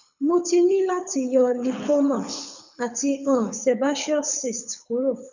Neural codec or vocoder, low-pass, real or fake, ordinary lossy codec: codec, 24 kHz, 6 kbps, HILCodec; 7.2 kHz; fake; none